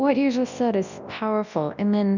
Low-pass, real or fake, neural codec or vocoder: 7.2 kHz; fake; codec, 24 kHz, 0.9 kbps, WavTokenizer, large speech release